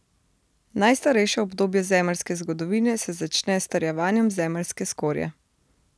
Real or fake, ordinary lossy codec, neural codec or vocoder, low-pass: real; none; none; none